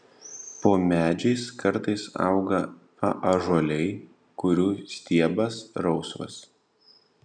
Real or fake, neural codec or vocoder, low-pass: real; none; 9.9 kHz